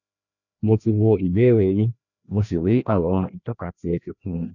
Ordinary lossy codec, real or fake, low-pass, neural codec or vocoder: none; fake; 7.2 kHz; codec, 16 kHz, 1 kbps, FreqCodec, larger model